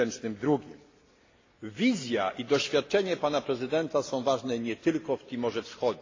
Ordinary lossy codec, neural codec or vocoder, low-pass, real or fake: AAC, 32 kbps; none; 7.2 kHz; real